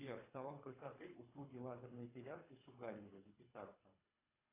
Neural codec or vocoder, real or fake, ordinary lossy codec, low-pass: codec, 24 kHz, 3 kbps, HILCodec; fake; AAC, 24 kbps; 3.6 kHz